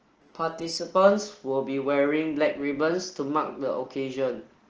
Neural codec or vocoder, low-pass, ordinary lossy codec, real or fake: none; 7.2 kHz; Opus, 16 kbps; real